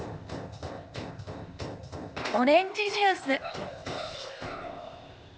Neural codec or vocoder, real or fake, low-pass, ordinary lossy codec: codec, 16 kHz, 0.8 kbps, ZipCodec; fake; none; none